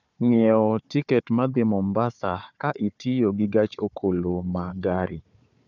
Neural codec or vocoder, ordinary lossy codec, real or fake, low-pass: codec, 16 kHz, 4 kbps, FunCodec, trained on Chinese and English, 50 frames a second; none; fake; 7.2 kHz